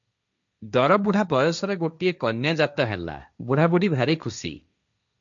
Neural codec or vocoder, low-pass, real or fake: codec, 16 kHz, 1.1 kbps, Voila-Tokenizer; 7.2 kHz; fake